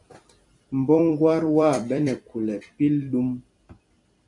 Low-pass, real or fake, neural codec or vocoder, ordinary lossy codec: 10.8 kHz; fake; vocoder, 44.1 kHz, 128 mel bands every 256 samples, BigVGAN v2; AAC, 64 kbps